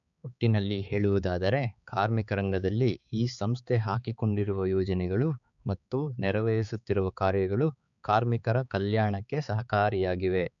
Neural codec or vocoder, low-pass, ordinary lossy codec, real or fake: codec, 16 kHz, 4 kbps, X-Codec, HuBERT features, trained on balanced general audio; 7.2 kHz; none; fake